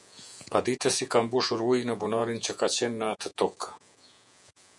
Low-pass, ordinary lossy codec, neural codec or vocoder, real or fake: 10.8 kHz; MP3, 96 kbps; vocoder, 48 kHz, 128 mel bands, Vocos; fake